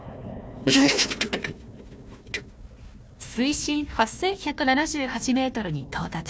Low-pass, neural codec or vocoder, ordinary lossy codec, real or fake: none; codec, 16 kHz, 1 kbps, FunCodec, trained on Chinese and English, 50 frames a second; none; fake